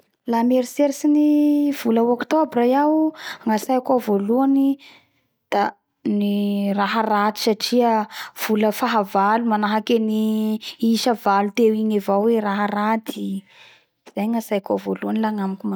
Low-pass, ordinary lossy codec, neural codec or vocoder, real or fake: none; none; none; real